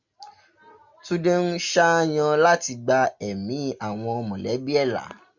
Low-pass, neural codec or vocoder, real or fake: 7.2 kHz; none; real